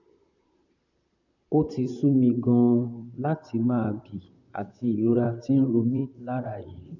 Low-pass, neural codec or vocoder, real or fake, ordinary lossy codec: 7.2 kHz; vocoder, 44.1 kHz, 128 mel bands, Pupu-Vocoder; fake; none